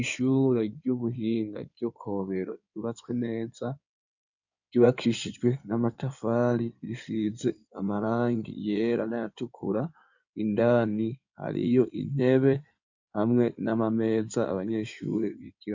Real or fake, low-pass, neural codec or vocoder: fake; 7.2 kHz; codec, 16 kHz in and 24 kHz out, 2.2 kbps, FireRedTTS-2 codec